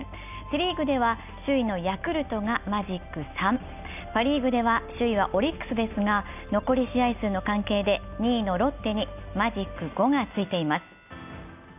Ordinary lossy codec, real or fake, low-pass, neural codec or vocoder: none; real; 3.6 kHz; none